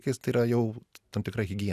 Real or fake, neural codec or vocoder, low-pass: real; none; 14.4 kHz